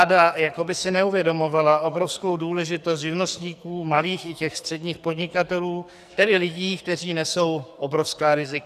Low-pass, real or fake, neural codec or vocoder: 14.4 kHz; fake; codec, 44.1 kHz, 2.6 kbps, SNAC